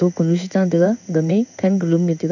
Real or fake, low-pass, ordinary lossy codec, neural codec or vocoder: fake; 7.2 kHz; none; codec, 16 kHz in and 24 kHz out, 1 kbps, XY-Tokenizer